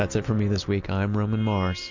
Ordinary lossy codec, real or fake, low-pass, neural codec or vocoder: MP3, 48 kbps; real; 7.2 kHz; none